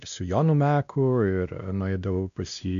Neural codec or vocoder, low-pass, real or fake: codec, 16 kHz, 1 kbps, X-Codec, WavLM features, trained on Multilingual LibriSpeech; 7.2 kHz; fake